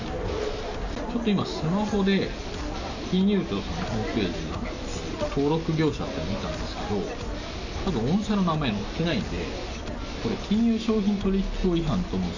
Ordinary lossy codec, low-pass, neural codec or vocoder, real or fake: none; 7.2 kHz; none; real